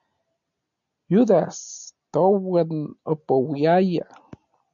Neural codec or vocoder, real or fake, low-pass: none; real; 7.2 kHz